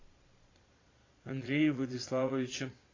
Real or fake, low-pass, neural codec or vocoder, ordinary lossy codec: fake; 7.2 kHz; vocoder, 22.05 kHz, 80 mel bands, Vocos; AAC, 32 kbps